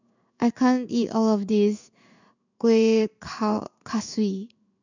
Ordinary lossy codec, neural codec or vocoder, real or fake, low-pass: none; codec, 16 kHz in and 24 kHz out, 1 kbps, XY-Tokenizer; fake; 7.2 kHz